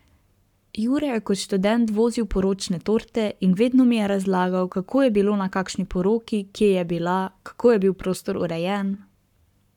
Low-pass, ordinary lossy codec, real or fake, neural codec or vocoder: 19.8 kHz; none; fake; codec, 44.1 kHz, 7.8 kbps, Pupu-Codec